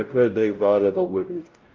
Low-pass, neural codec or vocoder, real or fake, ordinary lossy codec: 7.2 kHz; codec, 16 kHz, 0.5 kbps, X-Codec, WavLM features, trained on Multilingual LibriSpeech; fake; Opus, 24 kbps